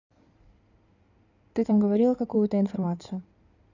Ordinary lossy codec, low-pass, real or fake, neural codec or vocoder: none; 7.2 kHz; fake; codec, 16 kHz in and 24 kHz out, 2.2 kbps, FireRedTTS-2 codec